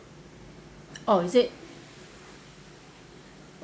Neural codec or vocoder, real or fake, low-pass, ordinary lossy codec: none; real; none; none